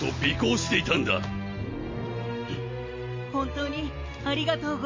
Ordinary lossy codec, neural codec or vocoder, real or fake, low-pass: MP3, 32 kbps; none; real; 7.2 kHz